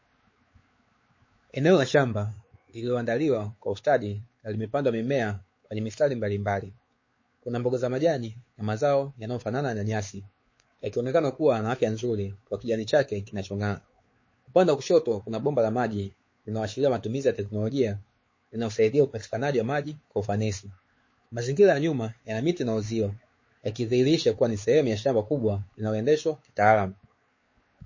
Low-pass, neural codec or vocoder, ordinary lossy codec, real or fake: 7.2 kHz; codec, 16 kHz, 4 kbps, X-Codec, WavLM features, trained on Multilingual LibriSpeech; MP3, 32 kbps; fake